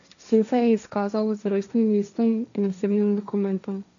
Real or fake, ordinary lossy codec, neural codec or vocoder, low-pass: fake; none; codec, 16 kHz, 1.1 kbps, Voila-Tokenizer; 7.2 kHz